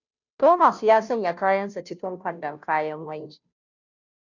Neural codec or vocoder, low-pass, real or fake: codec, 16 kHz, 0.5 kbps, FunCodec, trained on Chinese and English, 25 frames a second; 7.2 kHz; fake